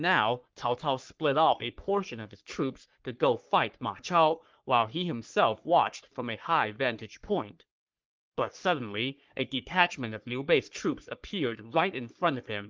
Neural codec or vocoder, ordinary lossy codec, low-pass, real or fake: codec, 44.1 kHz, 3.4 kbps, Pupu-Codec; Opus, 24 kbps; 7.2 kHz; fake